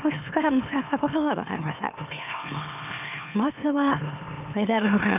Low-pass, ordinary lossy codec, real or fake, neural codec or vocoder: 3.6 kHz; none; fake; autoencoder, 44.1 kHz, a latent of 192 numbers a frame, MeloTTS